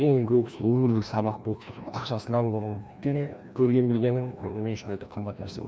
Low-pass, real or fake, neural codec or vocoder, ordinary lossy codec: none; fake; codec, 16 kHz, 1 kbps, FreqCodec, larger model; none